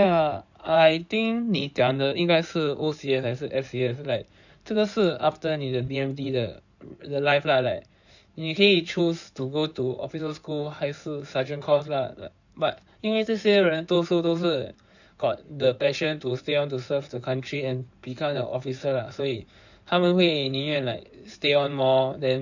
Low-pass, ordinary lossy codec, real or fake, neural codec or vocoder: 7.2 kHz; none; fake; codec, 16 kHz in and 24 kHz out, 2.2 kbps, FireRedTTS-2 codec